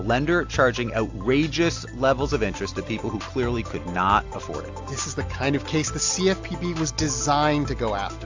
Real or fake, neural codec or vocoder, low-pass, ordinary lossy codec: real; none; 7.2 kHz; AAC, 48 kbps